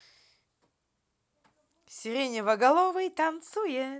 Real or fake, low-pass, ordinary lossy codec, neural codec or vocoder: real; none; none; none